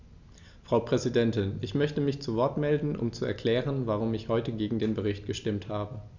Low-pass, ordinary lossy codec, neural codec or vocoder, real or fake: 7.2 kHz; none; none; real